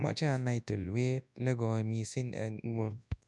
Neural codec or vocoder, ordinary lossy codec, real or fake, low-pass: codec, 24 kHz, 0.9 kbps, WavTokenizer, large speech release; none; fake; 10.8 kHz